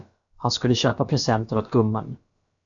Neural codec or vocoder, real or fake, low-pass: codec, 16 kHz, about 1 kbps, DyCAST, with the encoder's durations; fake; 7.2 kHz